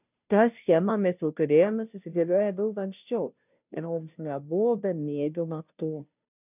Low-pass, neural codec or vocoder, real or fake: 3.6 kHz; codec, 16 kHz, 0.5 kbps, FunCodec, trained on Chinese and English, 25 frames a second; fake